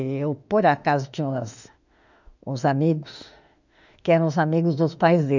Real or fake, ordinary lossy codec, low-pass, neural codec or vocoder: fake; none; 7.2 kHz; autoencoder, 48 kHz, 32 numbers a frame, DAC-VAE, trained on Japanese speech